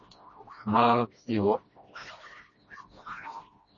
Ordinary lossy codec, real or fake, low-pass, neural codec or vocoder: MP3, 32 kbps; fake; 7.2 kHz; codec, 16 kHz, 1 kbps, FreqCodec, smaller model